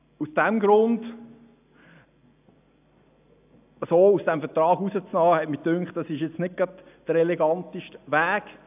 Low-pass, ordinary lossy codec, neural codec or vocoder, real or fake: 3.6 kHz; AAC, 32 kbps; none; real